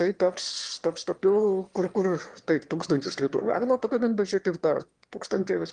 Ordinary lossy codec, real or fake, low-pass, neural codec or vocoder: Opus, 24 kbps; fake; 9.9 kHz; autoencoder, 22.05 kHz, a latent of 192 numbers a frame, VITS, trained on one speaker